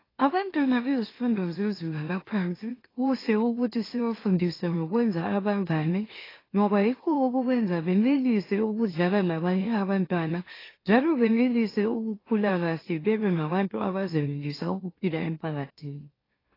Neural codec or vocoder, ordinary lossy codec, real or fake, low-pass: autoencoder, 44.1 kHz, a latent of 192 numbers a frame, MeloTTS; AAC, 24 kbps; fake; 5.4 kHz